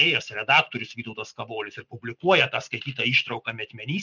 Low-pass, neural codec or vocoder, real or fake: 7.2 kHz; none; real